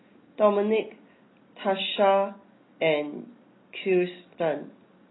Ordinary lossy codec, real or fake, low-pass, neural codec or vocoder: AAC, 16 kbps; real; 7.2 kHz; none